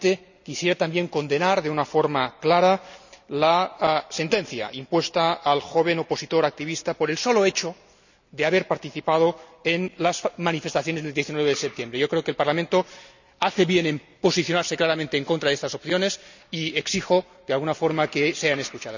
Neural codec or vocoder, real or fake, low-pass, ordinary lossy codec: none; real; 7.2 kHz; none